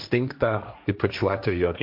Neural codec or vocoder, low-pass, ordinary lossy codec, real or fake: codec, 16 kHz, 1.1 kbps, Voila-Tokenizer; 5.4 kHz; MP3, 48 kbps; fake